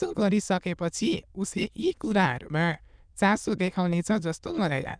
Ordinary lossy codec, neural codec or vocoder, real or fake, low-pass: none; autoencoder, 22.05 kHz, a latent of 192 numbers a frame, VITS, trained on many speakers; fake; 9.9 kHz